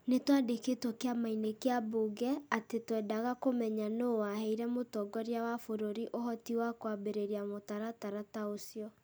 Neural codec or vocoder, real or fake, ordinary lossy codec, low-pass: none; real; none; none